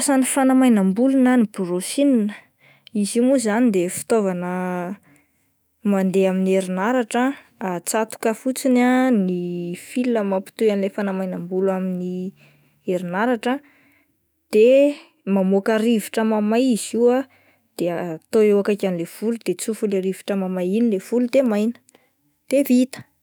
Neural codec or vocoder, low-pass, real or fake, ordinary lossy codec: autoencoder, 48 kHz, 128 numbers a frame, DAC-VAE, trained on Japanese speech; none; fake; none